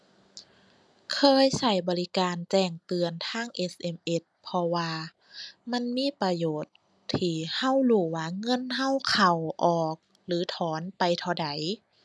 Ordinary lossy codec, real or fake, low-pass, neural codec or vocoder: none; real; none; none